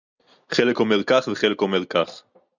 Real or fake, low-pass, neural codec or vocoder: real; 7.2 kHz; none